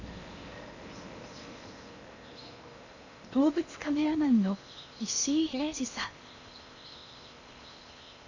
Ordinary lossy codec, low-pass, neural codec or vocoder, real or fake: none; 7.2 kHz; codec, 16 kHz in and 24 kHz out, 0.8 kbps, FocalCodec, streaming, 65536 codes; fake